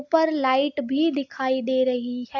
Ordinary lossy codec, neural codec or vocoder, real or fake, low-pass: AAC, 48 kbps; none; real; 7.2 kHz